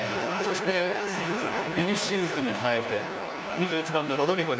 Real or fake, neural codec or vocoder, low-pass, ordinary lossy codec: fake; codec, 16 kHz, 1 kbps, FunCodec, trained on LibriTTS, 50 frames a second; none; none